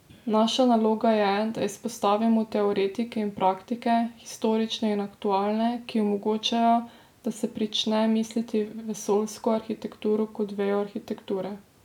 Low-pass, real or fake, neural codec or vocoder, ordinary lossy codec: 19.8 kHz; real; none; none